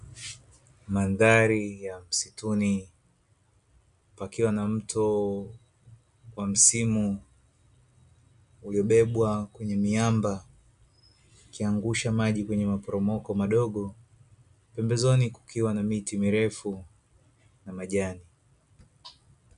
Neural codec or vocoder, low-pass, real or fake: none; 10.8 kHz; real